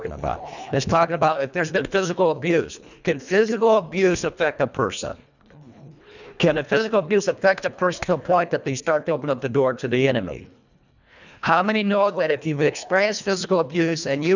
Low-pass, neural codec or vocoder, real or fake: 7.2 kHz; codec, 24 kHz, 1.5 kbps, HILCodec; fake